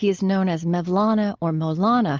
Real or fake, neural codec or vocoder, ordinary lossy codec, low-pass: real; none; Opus, 32 kbps; 7.2 kHz